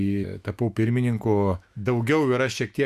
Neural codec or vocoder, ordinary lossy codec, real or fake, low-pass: autoencoder, 48 kHz, 128 numbers a frame, DAC-VAE, trained on Japanese speech; Opus, 64 kbps; fake; 14.4 kHz